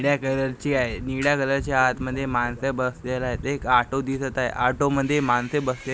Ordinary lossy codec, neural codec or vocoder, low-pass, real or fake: none; none; none; real